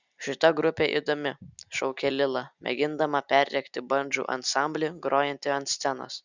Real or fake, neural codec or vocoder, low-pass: real; none; 7.2 kHz